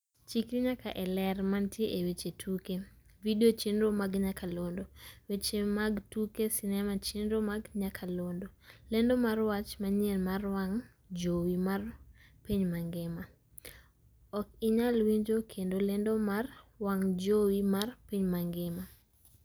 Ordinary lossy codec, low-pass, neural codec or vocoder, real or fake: none; none; none; real